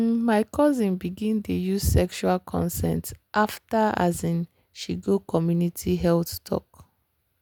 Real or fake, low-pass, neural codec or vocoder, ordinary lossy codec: real; none; none; none